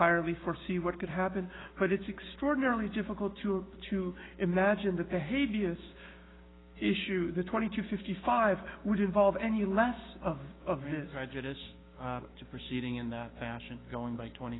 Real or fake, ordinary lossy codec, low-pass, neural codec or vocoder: fake; AAC, 16 kbps; 7.2 kHz; codec, 16 kHz, 6 kbps, DAC